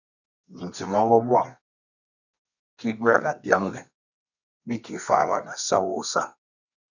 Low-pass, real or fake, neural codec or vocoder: 7.2 kHz; fake; codec, 24 kHz, 0.9 kbps, WavTokenizer, medium music audio release